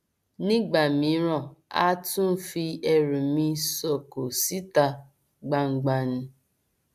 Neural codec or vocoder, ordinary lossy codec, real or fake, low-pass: none; AAC, 96 kbps; real; 14.4 kHz